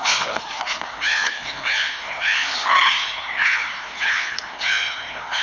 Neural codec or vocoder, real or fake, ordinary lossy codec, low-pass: codec, 16 kHz, 2 kbps, FreqCodec, larger model; fake; none; 7.2 kHz